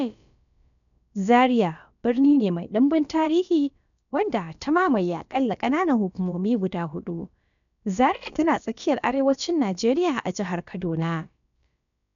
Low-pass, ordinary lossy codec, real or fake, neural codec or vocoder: 7.2 kHz; none; fake; codec, 16 kHz, about 1 kbps, DyCAST, with the encoder's durations